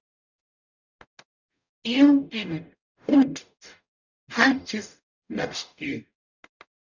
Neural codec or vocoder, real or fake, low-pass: codec, 44.1 kHz, 0.9 kbps, DAC; fake; 7.2 kHz